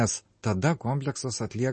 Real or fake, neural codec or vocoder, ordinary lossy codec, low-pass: real; none; MP3, 32 kbps; 10.8 kHz